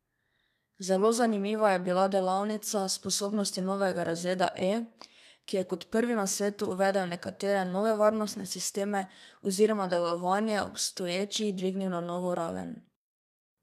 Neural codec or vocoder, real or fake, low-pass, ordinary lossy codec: codec, 32 kHz, 1.9 kbps, SNAC; fake; 14.4 kHz; none